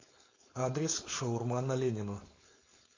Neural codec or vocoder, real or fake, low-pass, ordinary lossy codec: codec, 16 kHz, 4.8 kbps, FACodec; fake; 7.2 kHz; AAC, 32 kbps